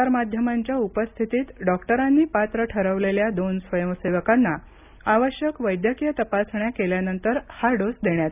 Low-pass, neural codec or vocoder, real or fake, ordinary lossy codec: 3.6 kHz; none; real; none